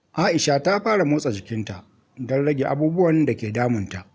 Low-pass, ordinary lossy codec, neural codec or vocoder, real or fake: none; none; none; real